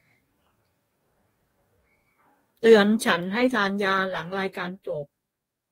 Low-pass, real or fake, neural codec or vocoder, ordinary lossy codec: 19.8 kHz; fake; codec, 44.1 kHz, 2.6 kbps, DAC; AAC, 48 kbps